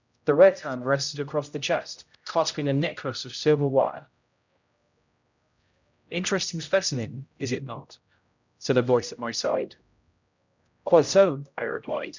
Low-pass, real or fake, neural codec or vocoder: 7.2 kHz; fake; codec, 16 kHz, 0.5 kbps, X-Codec, HuBERT features, trained on general audio